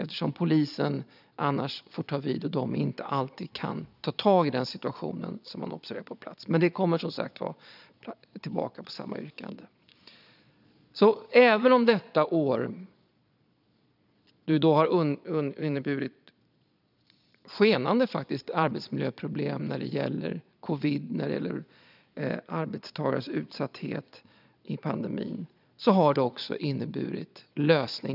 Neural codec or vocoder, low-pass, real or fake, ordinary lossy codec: vocoder, 22.05 kHz, 80 mel bands, Vocos; 5.4 kHz; fake; none